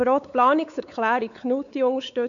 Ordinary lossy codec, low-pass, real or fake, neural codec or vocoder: none; 7.2 kHz; fake; codec, 16 kHz, 4 kbps, X-Codec, WavLM features, trained on Multilingual LibriSpeech